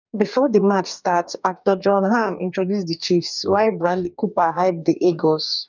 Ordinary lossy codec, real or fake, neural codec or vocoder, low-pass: none; fake; codec, 44.1 kHz, 2.6 kbps, DAC; 7.2 kHz